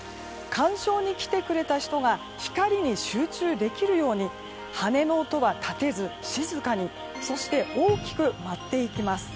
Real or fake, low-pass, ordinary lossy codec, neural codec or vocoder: real; none; none; none